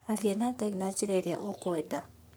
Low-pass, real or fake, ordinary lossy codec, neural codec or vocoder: none; fake; none; codec, 44.1 kHz, 3.4 kbps, Pupu-Codec